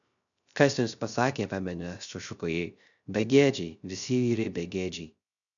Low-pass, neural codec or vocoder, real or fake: 7.2 kHz; codec, 16 kHz, 0.3 kbps, FocalCodec; fake